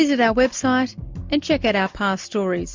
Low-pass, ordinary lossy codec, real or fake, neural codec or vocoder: 7.2 kHz; MP3, 64 kbps; real; none